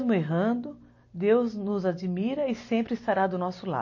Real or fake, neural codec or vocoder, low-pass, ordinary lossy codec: real; none; 7.2 kHz; MP3, 32 kbps